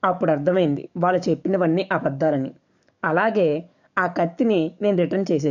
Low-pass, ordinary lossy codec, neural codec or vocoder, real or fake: 7.2 kHz; none; codec, 44.1 kHz, 7.8 kbps, DAC; fake